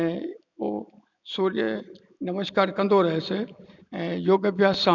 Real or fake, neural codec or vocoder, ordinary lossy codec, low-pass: real; none; none; 7.2 kHz